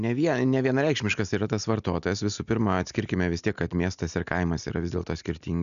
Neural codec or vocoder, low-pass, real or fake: none; 7.2 kHz; real